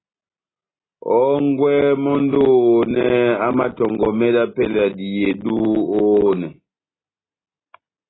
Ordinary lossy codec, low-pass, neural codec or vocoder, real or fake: AAC, 16 kbps; 7.2 kHz; none; real